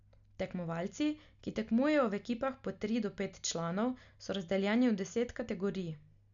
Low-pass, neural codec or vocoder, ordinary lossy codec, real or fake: 7.2 kHz; none; none; real